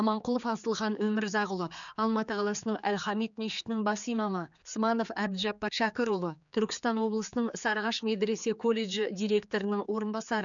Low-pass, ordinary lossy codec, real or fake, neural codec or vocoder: 7.2 kHz; none; fake; codec, 16 kHz, 4 kbps, X-Codec, HuBERT features, trained on general audio